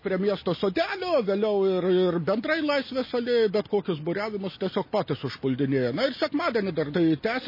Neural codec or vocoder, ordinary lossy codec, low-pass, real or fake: none; MP3, 24 kbps; 5.4 kHz; real